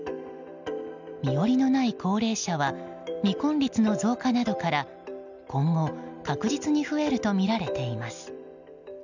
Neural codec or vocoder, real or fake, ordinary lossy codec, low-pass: none; real; none; 7.2 kHz